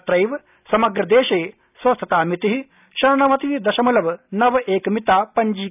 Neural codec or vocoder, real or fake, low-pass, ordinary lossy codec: none; real; 3.6 kHz; none